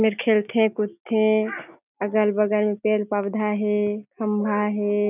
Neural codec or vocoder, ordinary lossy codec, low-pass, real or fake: none; none; 3.6 kHz; real